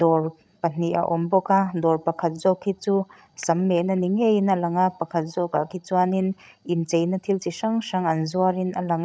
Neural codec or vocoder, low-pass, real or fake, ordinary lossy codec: codec, 16 kHz, 16 kbps, FreqCodec, larger model; none; fake; none